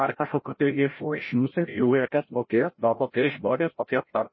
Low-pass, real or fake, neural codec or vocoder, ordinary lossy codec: 7.2 kHz; fake; codec, 16 kHz, 0.5 kbps, FreqCodec, larger model; MP3, 24 kbps